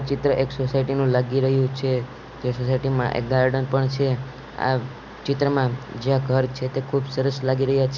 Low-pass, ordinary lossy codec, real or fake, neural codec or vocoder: 7.2 kHz; none; real; none